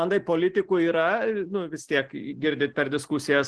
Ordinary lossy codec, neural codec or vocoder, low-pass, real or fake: Opus, 16 kbps; none; 10.8 kHz; real